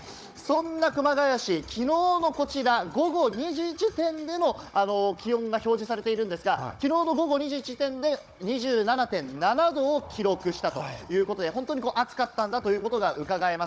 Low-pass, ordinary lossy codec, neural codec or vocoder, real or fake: none; none; codec, 16 kHz, 16 kbps, FunCodec, trained on Chinese and English, 50 frames a second; fake